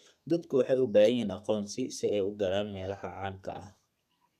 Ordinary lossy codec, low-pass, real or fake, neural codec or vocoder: none; 14.4 kHz; fake; codec, 32 kHz, 1.9 kbps, SNAC